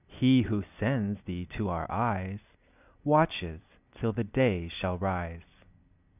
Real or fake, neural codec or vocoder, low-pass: fake; vocoder, 44.1 kHz, 128 mel bands every 256 samples, BigVGAN v2; 3.6 kHz